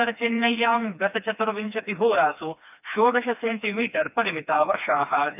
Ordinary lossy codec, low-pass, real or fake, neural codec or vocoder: none; 3.6 kHz; fake; codec, 16 kHz, 2 kbps, FreqCodec, smaller model